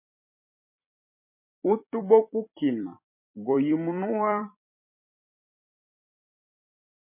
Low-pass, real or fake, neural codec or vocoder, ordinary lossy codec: 3.6 kHz; fake; vocoder, 44.1 kHz, 128 mel bands every 256 samples, BigVGAN v2; MP3, 24 kbps